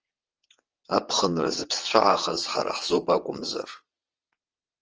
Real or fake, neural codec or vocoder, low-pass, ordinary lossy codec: fake; vocoder, 22.05 kHz, 80 mel bands, WaveNeXt; 7.2 kHz; Opus, 24 kbps